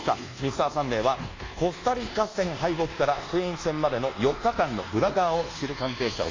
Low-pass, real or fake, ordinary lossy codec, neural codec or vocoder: 7.2 kHz; fake; AAC, 32 kbps; codec, 24 kHz, 1.2 kbps, DualCodec